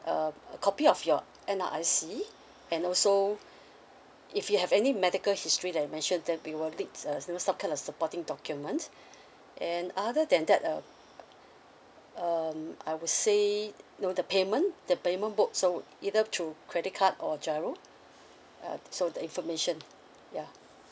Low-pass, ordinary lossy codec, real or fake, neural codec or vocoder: none; none; real; none